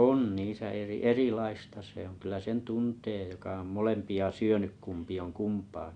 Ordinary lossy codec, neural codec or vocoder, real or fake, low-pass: none; none; real; 9.9 kHz